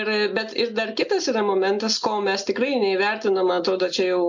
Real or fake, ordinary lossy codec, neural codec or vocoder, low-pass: real; MP3, 64 kbps; none; 7.2 kHz